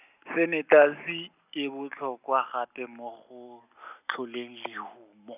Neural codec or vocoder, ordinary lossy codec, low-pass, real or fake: none; none; 3.6 kHz; real